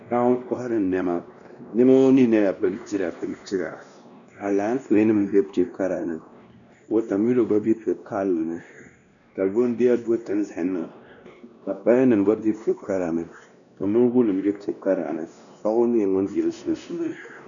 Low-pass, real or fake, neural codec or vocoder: 7.2 kHz; fake; codec, 16 kHz, 1 kbps, X-Codec, WavLM features, trained on Multilingual LibriSpeech